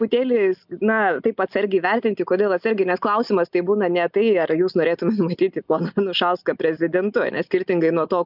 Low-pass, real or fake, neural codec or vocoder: 5.4 kHz; real; none